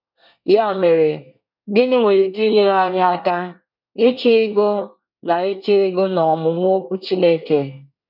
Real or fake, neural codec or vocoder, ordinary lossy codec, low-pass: fake; codec, 24 kHz, 1 kbps, SNAC; none; 5.4 kHz